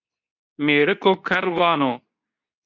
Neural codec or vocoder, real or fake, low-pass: codec, 24 kHz, 0.9 kbps, WavTokenizer, medium speech release version 2; fake; 7.2 kHz